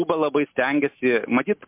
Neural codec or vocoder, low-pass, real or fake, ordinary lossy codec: none; 3.6 kHz; real; MP3, 32 kbps